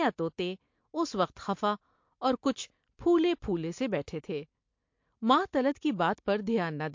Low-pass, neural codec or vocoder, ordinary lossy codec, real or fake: 7.2 kHz; none; MP3, 48 kbps; real